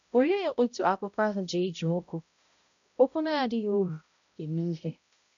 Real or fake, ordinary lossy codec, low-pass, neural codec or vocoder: fake; none; 7.2 kHz; codec, 16 kHz, 0.5 kbps, X-Codec, HuBERT features, trained on balanced general audio